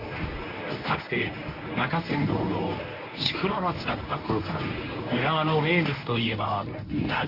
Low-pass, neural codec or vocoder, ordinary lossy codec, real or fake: 5.4 kHz; codec, 24 kHz, 0.9 kbps, WavTokenizer, medium speech release version 1; AAC, 32 kbps; fake